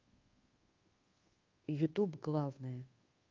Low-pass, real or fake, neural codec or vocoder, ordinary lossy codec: 7.2 kHz; fake; codec, 24 kHz, 1.2 kbps, DualCodec; Opus, 32 kbps